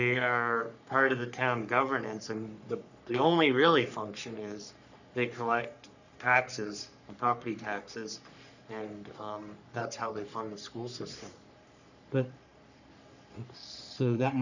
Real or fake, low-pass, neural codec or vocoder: fake; 7.2 kHz; codec, 44.1 kHz, 3.4 kbps, Pupu-Codec